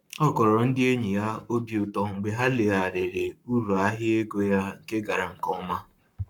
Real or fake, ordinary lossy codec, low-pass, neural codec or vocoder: fake; none; 19.8 kHz; codec, 44.1 kHz, 7.8 kbps, Pupu-Codec